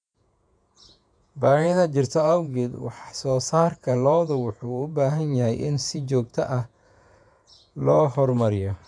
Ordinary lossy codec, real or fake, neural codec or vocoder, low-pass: none; fake; vocoder, 48 kHz, 128 mel bands, Vocos; 9.9 kHz